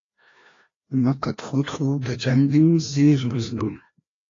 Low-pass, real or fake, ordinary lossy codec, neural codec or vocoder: 7.2 kHz; fake; AAC, 32 kbps; codec, 16 kHz, 1 kbps, FreqCodec, larger model